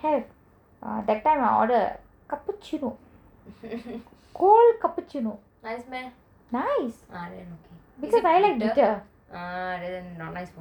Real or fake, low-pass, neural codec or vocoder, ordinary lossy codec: real; 19.8 kHz; none; none